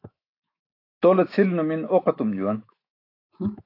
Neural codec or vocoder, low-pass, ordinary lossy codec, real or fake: autoencoder, 48 kHz, 128 numbers a frame, DAC-VAE, trained on Japanese speech; 5.4 kHz; AAC, 32 kbps; fake